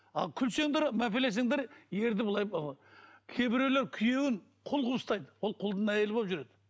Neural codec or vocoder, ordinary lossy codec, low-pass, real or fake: none; none; none; real